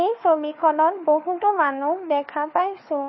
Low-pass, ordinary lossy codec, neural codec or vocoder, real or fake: 7.2 kHz; MP3, 24 kbps; codec, 16 kHz, 4 kbps, FunCodec, trained on LibriTTS, 50 frames a second; fake